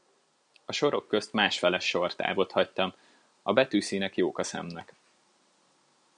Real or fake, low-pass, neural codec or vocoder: real; 9.9 kHz; none